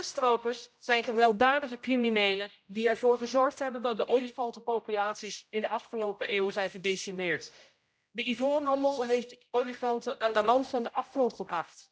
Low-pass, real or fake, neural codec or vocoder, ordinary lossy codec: none; fake; codec, 16 kHz, 0.5 kbps, X-Codec, HuBERT features, trained on general audio; none